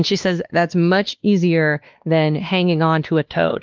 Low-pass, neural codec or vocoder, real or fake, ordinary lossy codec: 7.2 kHz; codec, 16 kHz, 2 kbps, X-Codec, WavLM features, trained on Multilingual LibriSpeech; fake; Opus, 32 kbps